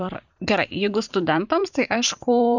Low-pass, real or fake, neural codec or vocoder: 7.2 kHz; fake; vocoder, 44.1 kHz, 128 mel bands every 512 samples, BigVGAN v2